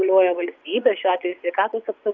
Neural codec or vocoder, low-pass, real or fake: vocoder, 24 kHz, 100 mel bands, Vocos; 7.2 kHz; fake